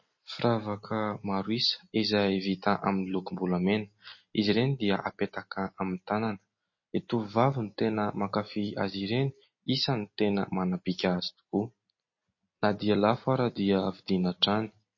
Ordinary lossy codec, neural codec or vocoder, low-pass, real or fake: MP3, 32 kbps; none; 7.2 kHz; real